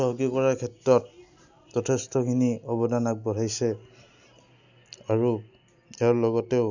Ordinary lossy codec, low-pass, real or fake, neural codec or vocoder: none; 7.2 kHz; real; none